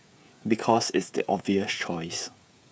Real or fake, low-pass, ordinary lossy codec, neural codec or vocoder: fake; none; none; codec, 16 kHz, 16 kbps, FreqCodec, smaller model